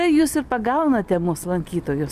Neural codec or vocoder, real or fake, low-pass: none; real; 14.4 kHz